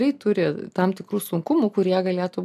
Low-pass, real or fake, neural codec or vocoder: 14.4 kHz; real; none